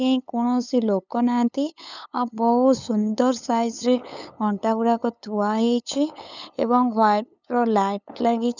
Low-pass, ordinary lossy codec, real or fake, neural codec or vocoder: 7.2 kHz; none; fake; codec, 16 kHz, 8 kbps, FunCodec, trained on LibriTTS, 25 frames a second